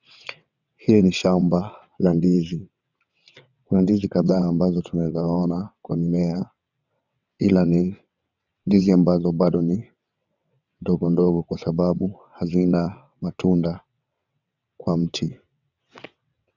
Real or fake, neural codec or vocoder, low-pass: fake; vocoder, 22.05 kHz, 80 mel bands, WaveNeXt; 7.2 kHz